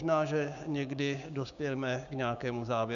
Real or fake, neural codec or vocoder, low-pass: fake; codec, 16 kHz, 6 kbps, DAC; 7.2 kHz